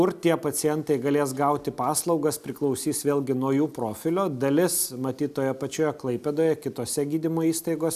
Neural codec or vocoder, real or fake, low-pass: none; real; 14.4 kHz